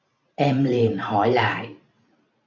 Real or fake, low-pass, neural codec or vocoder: fake; 7.2 kHz; vocoder, 44.1 kHz, 128 mel bands every 512 samples, BigVGAN v2